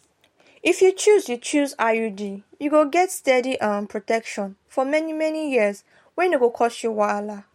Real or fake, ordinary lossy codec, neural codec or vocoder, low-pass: real; MP3, 64 kbps; none; 19.8 kHz